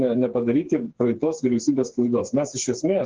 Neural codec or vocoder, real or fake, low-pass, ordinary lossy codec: codec, 16 kHz, 4 kbps, FreqCodec, smaller model; fake; 7.2 kHz; Opus, 16 kbps